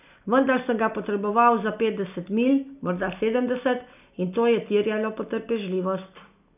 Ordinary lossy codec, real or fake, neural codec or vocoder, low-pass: none; real; none; 3.6 kHz